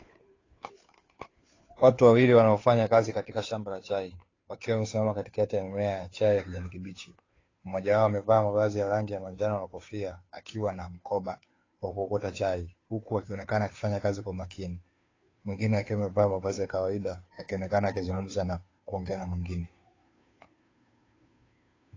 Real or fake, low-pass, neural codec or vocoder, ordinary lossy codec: fake; 7.2 kHz; codec, 16 kHz, 2 kbps, FunCodec, trained on Chinese and English, 25 frames a second; AAC, 32 kbps